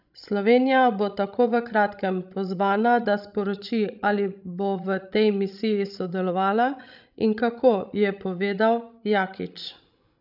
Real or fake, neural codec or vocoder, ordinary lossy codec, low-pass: fake; codec, 16 kHz, 16 kbps, FreqCodec, larger model; none; 5.4 kHz